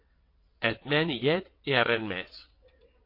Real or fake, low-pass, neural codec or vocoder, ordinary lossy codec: fake; 5.4 kHz; vocoder, 22.05 kHz, 80 mel bands, WaveNeXt; MP3, 32 kbps